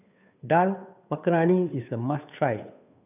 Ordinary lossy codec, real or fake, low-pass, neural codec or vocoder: none; fake; 3.6 kHz; codec, 16 kHz, 2 kbps, FunCodec, trained on Chinese and English, 25 frames a second